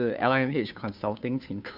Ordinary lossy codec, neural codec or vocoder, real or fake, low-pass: none; codec, 16 kHz, 2 kbps, FunCodec, trained on Chinese and English, 25 frames a second; fake; 5.4 kHz